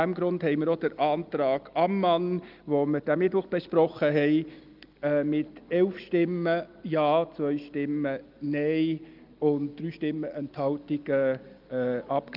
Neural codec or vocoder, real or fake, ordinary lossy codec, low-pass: none; real; Opus, 24 kbps; 5.4 kHz